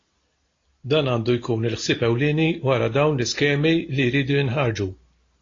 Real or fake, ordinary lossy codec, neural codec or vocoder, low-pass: real; AAC, 32 kbps; none; 7.2 kHz